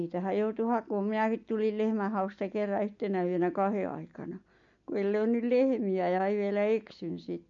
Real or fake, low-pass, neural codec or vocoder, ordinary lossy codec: real; 7.2 kHz; none; MP3, 48 kbps